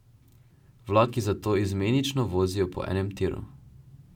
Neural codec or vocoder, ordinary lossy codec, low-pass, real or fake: none; none; 19.8 kHz; real